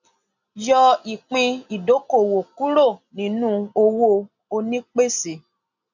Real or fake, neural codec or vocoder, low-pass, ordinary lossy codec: real; none; 7.2 kHz; none